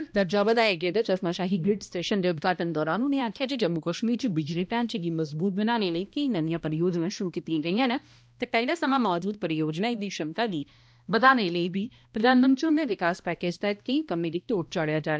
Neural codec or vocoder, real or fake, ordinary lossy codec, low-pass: codec, 16 kHz, 1 kbps, X-Codec, HuBERT features, trained on balanced general audio; fake; none; none